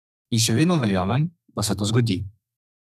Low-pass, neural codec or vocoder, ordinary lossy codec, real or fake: 14.4 kHz; codec, 32 kHz, 1.9 kbps, SNAC; none; fake